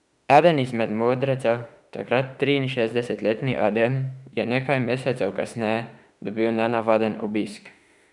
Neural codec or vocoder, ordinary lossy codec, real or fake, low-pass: autoencoder, 48 kHz, 32 numbers a frame, DAC-VAE, trained on Japanese speech; none; fake; 10.8 kHz